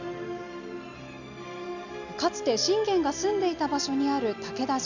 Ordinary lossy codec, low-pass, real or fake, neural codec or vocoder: none; 7.2 kHz; real; none